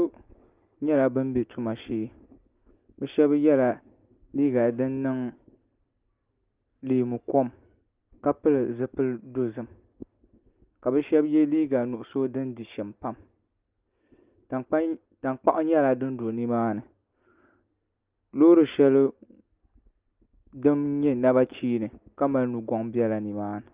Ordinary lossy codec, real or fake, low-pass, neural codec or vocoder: Opus, 32 kbps; real; 3.6 kHz; none